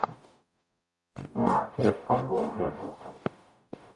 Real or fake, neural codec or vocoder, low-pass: fake; codec, 44.1 kHz, 0.9 kbps, DAC; 10.8 kHz